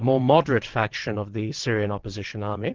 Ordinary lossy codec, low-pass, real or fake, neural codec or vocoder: Opus, 16 kbps; 7.2 kHz; fake; vocoder, 22.05 kHz, 80 mel bands, WaveNeXt